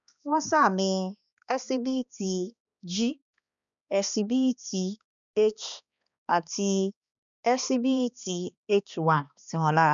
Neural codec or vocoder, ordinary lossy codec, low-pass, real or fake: codec, 16 kHz, 2 kbps, X-Codec, HuBERT features, trained on balanced general audio; none; 7.2 kHz; fake